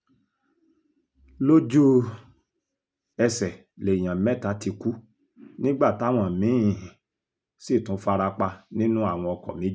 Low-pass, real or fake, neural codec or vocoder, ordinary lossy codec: none; real; none; none